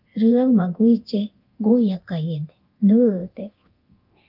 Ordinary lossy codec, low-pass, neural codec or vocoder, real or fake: Opus, 32 kbps; 5.4 kHz; codec, 24 kHz, 0.9 kbps, DualCodec; fake